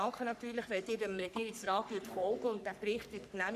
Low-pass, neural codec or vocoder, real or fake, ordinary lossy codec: 14.4 kHz; codec, 44.1 kHz, 3.4 kbps, Pupu-Codec; fake; none